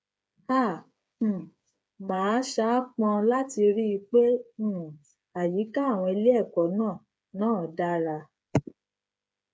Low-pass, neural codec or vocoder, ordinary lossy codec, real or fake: none; codec, 16 kHz, 8 kbps, FreqCodec, smaller model; none; fake